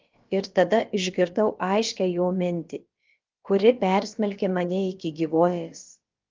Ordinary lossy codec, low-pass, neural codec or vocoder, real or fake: Opus, 32 kbps; 7.2 kHz; codec, 16 kHz, about 1 kbps, DyCAST, with the encoder's durations; fake